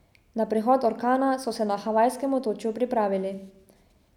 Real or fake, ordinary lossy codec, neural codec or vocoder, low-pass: real; none; none; 19.8 kHz